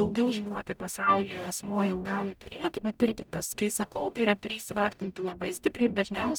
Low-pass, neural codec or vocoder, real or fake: 19.8 kHz; codec, 44.1 kHz, 0.9 kbps, DAC; fake